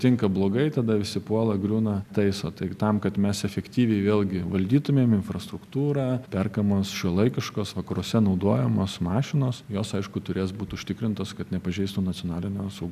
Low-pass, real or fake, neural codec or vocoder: 14.4 kHz; real; none